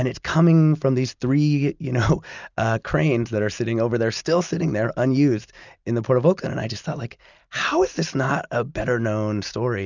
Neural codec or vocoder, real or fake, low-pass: none; real; 7.2 kHz